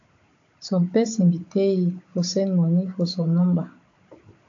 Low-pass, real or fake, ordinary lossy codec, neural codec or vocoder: 7.2 kHz; fake; AAC, 48 kbps; codec, 16 kHz, 16 kbps, FunCodec, trained on Chinese and English, 50 frames a second